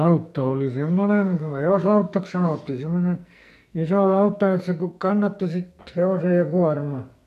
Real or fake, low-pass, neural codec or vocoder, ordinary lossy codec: fake; 14.4 kHz; codec, 44.1 kHz, 2.6 kbps, SNAC; none